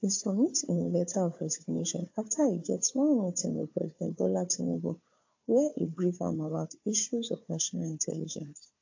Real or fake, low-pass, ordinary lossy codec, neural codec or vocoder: fake; 7.2 kHz; AAC, 48 kbps; codec, 16 kHz, 4 kbps, FunCodec, trained on Chinese and English, 50 frames a second